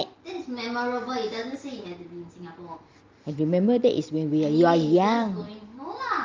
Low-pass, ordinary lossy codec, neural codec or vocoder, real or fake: 7.2 kHz; Opus, 32 kbps; none; real